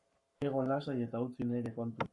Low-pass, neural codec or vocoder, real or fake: 10.8 kHz; codec, 44.1 kHz, 7.8 kbps, Pupu-Codec; fake